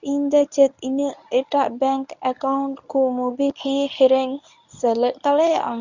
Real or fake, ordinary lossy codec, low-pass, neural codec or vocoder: fake; none; 7.2 kHz; codec, 24 kHz, 0.9 kbps, WavTokenizer, medium speech release version 1